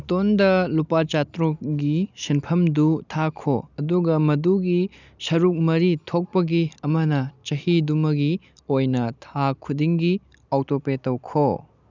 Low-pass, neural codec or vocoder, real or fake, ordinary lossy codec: 7.2 kHz; none; real; none